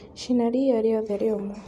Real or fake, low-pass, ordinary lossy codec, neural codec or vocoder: fake; 14.4 kHz; MP3, 64 kbps; vocoder, 44.1 kHz, 128 mel bands every 256 samples, BigVGAN v2